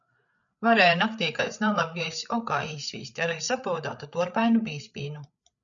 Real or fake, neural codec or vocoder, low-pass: fake; codec, 16 kHz, 8 kbps, FreqCodec, larger model; 7.2 kHz